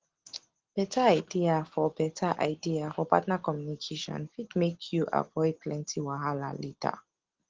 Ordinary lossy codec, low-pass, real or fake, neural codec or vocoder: Opus, 16 kbps; 7.2 kHz; real; none